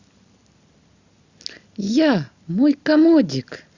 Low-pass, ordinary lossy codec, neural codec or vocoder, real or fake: 7.2 kHz; Opus, 64 kbps; none; real